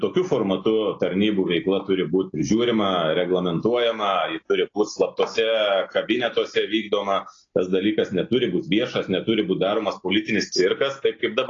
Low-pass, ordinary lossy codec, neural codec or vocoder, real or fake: 7.2 kHz; AAC, 32 kbps; none; real